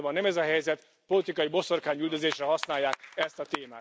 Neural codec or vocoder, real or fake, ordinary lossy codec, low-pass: none; real; none; none